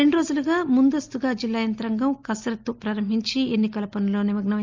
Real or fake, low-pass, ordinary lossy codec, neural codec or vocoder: real; 7.2 kHz; Opus, 32 kbps; none